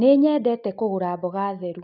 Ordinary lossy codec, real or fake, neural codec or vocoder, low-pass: none; real; none; 5.4 kHz